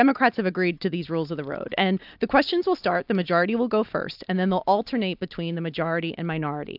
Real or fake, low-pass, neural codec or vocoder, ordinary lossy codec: real; 5.4 kHz; none; AAC, 48 kbps